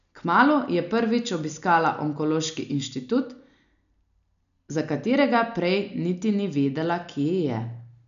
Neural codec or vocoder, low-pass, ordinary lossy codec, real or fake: none; 7.2 kHz; none; real